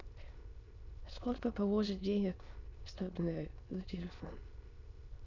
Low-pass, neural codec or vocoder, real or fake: 7.2 kHz; autoencoder, 22.05 kHz, a latent of 192 numbers a frame, VITS, trained on many speakers; fake